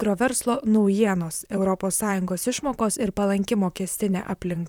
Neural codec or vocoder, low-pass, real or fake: vocoder, 44.1 kHz, 128 mel bands, Pupu-Vocoder; 19.8 kHz; fake